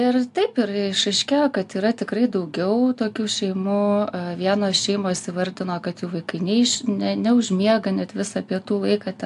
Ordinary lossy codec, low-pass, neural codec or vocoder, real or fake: AAC, 64 kbps; 10.8 kHz; none; real